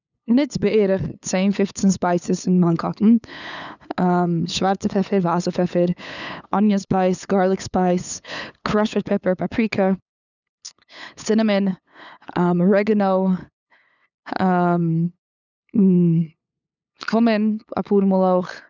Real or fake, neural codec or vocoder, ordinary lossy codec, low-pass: fake; codec, 16 kHz, 8 kbps, FunCodec, trained on LibriTTS, 25 frames a second; none; 7.2 kHz